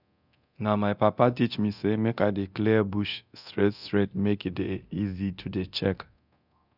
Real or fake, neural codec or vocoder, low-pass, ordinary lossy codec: fake; codec, 24 kHz, 0.9 kbps, DualCodec; 5.4 kHz; none